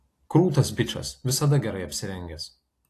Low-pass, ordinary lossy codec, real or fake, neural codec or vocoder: 14.4 kHz; AAC, 48 kbps; real; none